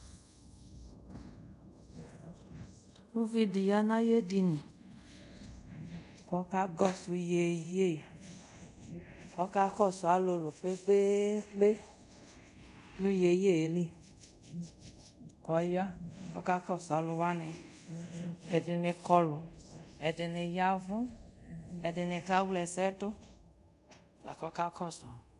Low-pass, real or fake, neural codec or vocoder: 10.8 kHz; fake; codec, 24 kHz, 0.5 kbps, DualCodec